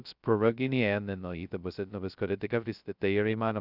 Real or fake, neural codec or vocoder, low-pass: fake; codec, 16 kHz, 0.2 kbps, FocalCodec; 5.4 kHz